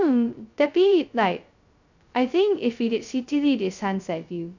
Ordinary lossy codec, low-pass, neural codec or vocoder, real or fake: none; 7.2 kHz; codec, 16 kHz, 0.2 kbps, FocalCodec; fake